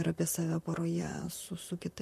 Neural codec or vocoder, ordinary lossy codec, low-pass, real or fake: vocoder, 44.1 kHz, 128 mel bands, Pupu-Vocoder; MP3, 64 kbps; 14.4 kHz; fake